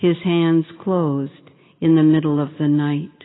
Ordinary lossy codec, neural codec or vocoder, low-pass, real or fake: AAC, 16 kbps; none; 7.2 kHz; real